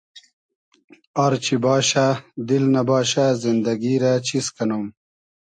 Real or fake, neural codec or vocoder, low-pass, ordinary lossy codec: real; none; 9.9 kHz; AAC, 64 kbps